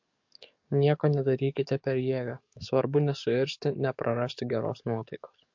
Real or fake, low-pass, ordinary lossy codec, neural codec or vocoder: fake; 7.2 kHz; MP3, 48 kbps; codec, 44.1 kHz, 7.8 kbps, DAC